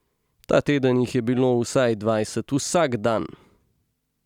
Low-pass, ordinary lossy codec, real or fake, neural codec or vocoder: 19.8 kHz; none; real; none